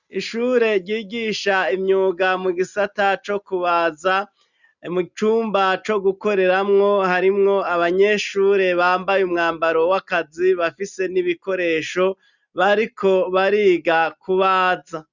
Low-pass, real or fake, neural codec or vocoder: 7.2 kHz; real; none